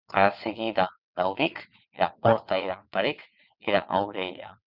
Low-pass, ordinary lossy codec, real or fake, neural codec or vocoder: 5.4 kHz; Opus, 64 kbps; fake; vocoder, 22.05 kHz, 80 mel bands, WaveNeXt